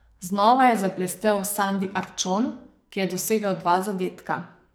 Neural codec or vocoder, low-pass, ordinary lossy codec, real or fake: codec, 44.1 kHz, 2.6 kbps, SNAC; none; none; fake